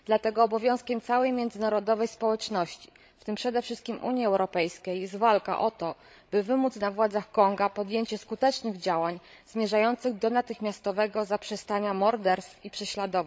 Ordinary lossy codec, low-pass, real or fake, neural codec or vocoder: none; none; fake; codec, 16 kHz, 16 kbps, FreqCodec, larger model